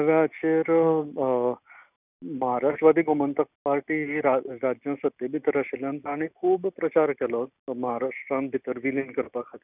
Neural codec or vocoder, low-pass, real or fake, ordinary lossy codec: none; 3.6 kHz; real; none